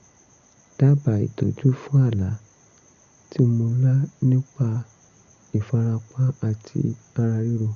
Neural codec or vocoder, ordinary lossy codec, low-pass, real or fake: none; none; 7.2 kHz; real